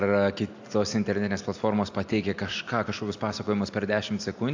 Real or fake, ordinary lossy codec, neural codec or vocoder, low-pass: real; AAC, 48 kbps; none; 7.2 kHz